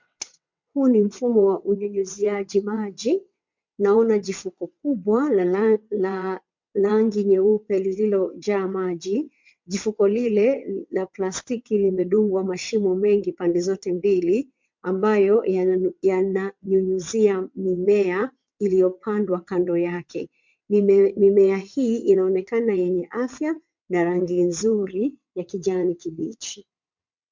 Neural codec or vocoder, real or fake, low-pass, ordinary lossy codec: vocoder, 22.05 kHz, 80 mel bands, WaveNeXt; fake; 7.2 kHz; MP3, 64 kbps